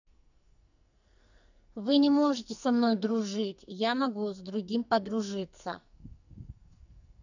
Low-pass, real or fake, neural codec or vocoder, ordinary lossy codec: 7.2 kHz; fake; codec, 44.1 kHz, 2.6 kbps, SNAC; none